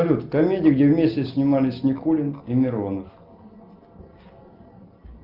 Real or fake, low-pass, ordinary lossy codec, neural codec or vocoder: real; 5.4 kHz; Opus, 24 kbps; none